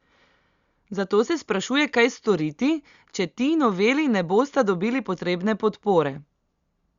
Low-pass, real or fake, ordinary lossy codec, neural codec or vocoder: 7.2 kHz; real; Opus, 64 kbps; none